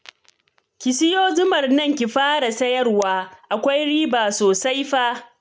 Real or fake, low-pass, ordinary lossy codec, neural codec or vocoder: real; none; none; none